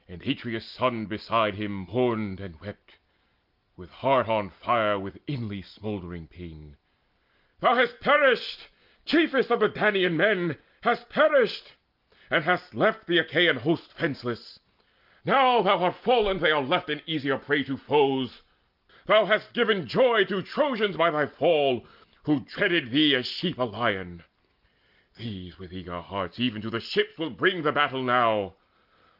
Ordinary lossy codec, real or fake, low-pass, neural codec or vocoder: Opus, 24 kbps; real; 5.4 kHz; none